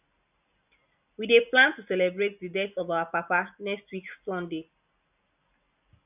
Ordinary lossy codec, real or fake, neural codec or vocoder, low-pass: none; real; none; 3.6 kHz